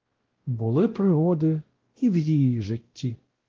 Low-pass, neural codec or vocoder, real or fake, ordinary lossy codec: 7.2 kHz; codec, 16 kHz, 0.5 kbps, X-Codec, WavLM features, trained on Multilingual LibriSpeech; fake; Opus, 16 kbps